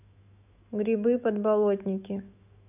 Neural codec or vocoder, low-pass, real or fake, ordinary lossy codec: autoencoder, 48 kHz, 128 numbers a frame, DAC-VAE, trained on Japanese speech; 3.6 kHz; fake; none